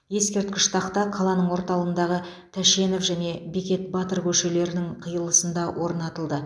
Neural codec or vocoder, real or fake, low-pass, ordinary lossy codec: none; real; none; none